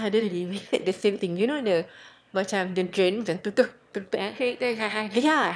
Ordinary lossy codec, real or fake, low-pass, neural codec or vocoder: none; fake; none; autoencoder, 22.05 kHz, a latent of 192 numbers a frame, VITS, trained on one speaker